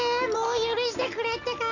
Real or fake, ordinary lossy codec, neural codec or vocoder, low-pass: real; none; none; 7.2 kHz